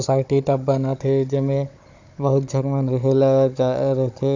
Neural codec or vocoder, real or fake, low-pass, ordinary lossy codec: codec, 16 kHz, 16 kbps, FunCodec, trained on Chinese and English, 50 frames a second; fake; 7.2 kHz; AAC, 48 kbps